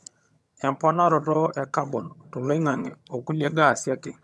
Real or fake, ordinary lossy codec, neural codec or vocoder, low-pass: fake; none; vocoder, 22.05 kHz, 80 mel bands, HiFi-GAN; none